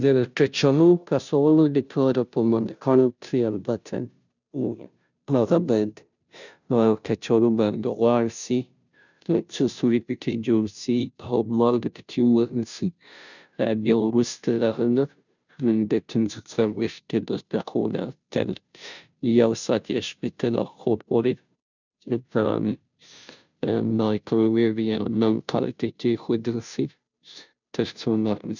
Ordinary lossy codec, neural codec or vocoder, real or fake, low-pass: none; codec, 16 kHz, 0.5 kbps, FunCodec, trained on Chinese and English, 25 frames a second; fake; 7.2 kHz